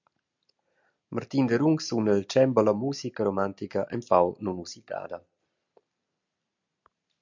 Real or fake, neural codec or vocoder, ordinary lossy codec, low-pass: real; none; MP3, 64 kbps; 7.2 kHz